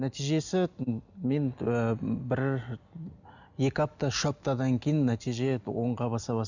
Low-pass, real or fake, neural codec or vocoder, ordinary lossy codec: 7.2 kHz; real; none; none